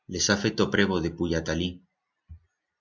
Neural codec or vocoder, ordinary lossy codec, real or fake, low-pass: none; MP3, 64 kbps; real; 7.2 kHz